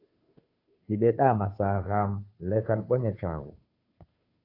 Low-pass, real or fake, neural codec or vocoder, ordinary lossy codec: 5.4 kHz; fake; codec, 16 kHz, 2 kbps, FunCodec, trained on Chinese and English, 25 frames a second; AAC, 32 kbps